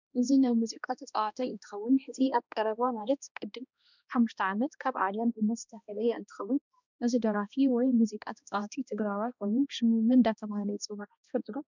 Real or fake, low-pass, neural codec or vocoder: fake; 7.2 kHz; codec, 16 kHz, 1 kbps, X-Codec, HuBERT features, trained on balanced general audio